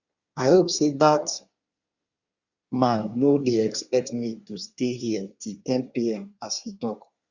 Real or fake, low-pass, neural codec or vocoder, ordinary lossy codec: fake; 7.2 kHz; codec, 24 kHz, 1 kbps, SNAC; Opus, 64 kbps